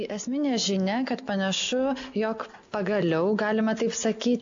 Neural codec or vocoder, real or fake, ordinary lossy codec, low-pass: none; real; AAC, 64 kbps; 7.2 kHz